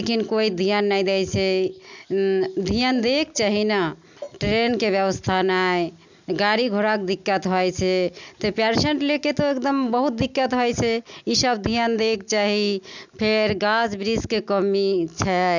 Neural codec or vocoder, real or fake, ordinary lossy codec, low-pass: none; real; none; 7.2 kHz